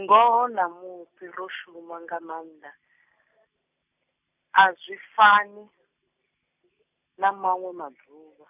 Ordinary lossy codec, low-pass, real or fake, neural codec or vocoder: none; 3.6 kHz; real; none